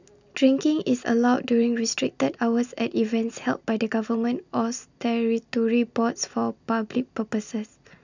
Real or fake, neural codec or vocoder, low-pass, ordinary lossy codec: real; none; 7.2 kHz; none